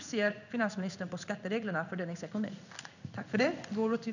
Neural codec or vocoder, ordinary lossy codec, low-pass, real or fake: codec, 16 kHz in and 24 kHz out, 1 kbps, XY-Tokenizer; none; 7.2 kHz; fake